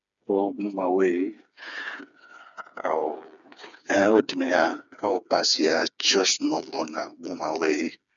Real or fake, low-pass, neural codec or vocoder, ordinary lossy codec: fake; 7.2 kHz; codec, 16 kHz, 4 kbps, FreqCodec, smaller model; MP3, 96 kbps